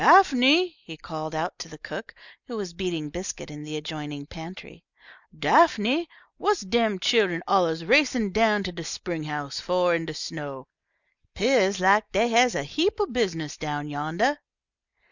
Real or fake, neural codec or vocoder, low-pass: real; none; 7.2 kHz